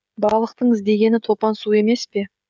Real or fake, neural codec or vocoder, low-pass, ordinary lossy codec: fake; codec, 16 kHz, 16 kbps, FreqCodec, smaller model; none; none